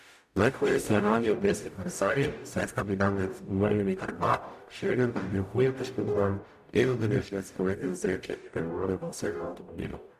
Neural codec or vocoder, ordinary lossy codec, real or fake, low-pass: codec, 44.1 kHz, 0.9 kbps, DAC; none; fake; 14.4 kHz